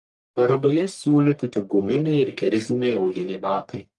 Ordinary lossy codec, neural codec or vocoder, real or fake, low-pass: Opus, 32 kbps; codec, 44.1 kHz, 1.7 kbps, Pupu-Codec; fake; 10.8 kHz